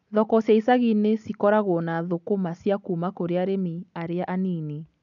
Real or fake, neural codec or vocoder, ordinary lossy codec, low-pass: real; none; none; 7.2 kHz